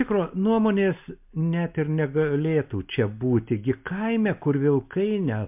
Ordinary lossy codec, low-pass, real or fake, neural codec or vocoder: MP3, 32 kbps; 3.6 kHz; real; none